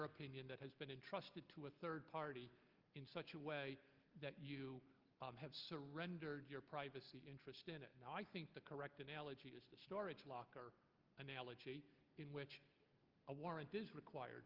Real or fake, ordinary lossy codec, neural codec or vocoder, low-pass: real; Opus, 32 kbps; none; 5.4 kHz